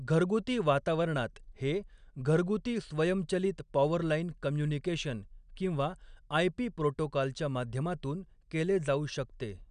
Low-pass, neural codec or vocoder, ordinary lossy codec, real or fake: 9.9 kHz; none; none; real